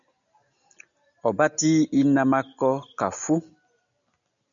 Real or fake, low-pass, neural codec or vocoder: real; 7.2 kHz; none